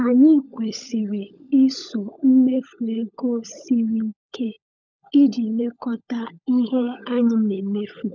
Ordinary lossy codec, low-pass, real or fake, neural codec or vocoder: none; 7.2 kHz; fake; codec, 16 kHz, 16 kbps, FunCodec, trained on LibriTTS, 50 frames a second